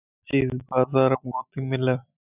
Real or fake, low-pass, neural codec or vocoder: real; 3.6 kHz; none